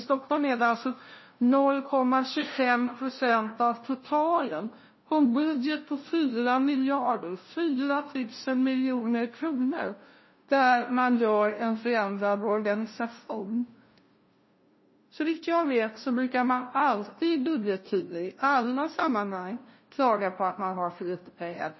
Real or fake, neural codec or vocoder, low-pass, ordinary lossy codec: fake; codec, 16 kHz, 0.5 kbps, FunCodec, trained on LibriTTS, 25 frames a second; 7.2 kHz; MP3, 24 kbps